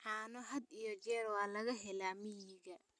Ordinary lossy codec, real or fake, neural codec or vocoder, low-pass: none; real; none; none